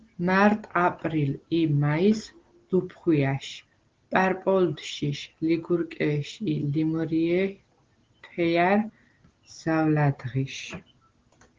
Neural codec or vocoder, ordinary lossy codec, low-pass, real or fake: none; Opus, 16 kbps; 7.2 kHz; real